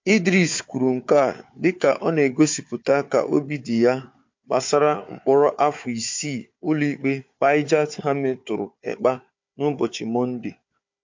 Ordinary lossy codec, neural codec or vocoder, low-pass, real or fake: MP3, 48 kbps; codec, 16 kHz, 4 kbps, FunCodec, trained on Chinese and English, 50 frames a second; 7.2 kHz; fake